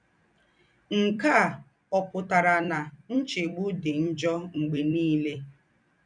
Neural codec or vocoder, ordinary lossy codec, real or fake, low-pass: vocoder, 44.1 kHz, 128 mel bands every 256 samples, BigVGAN v2; none; fake; 9.9 kHz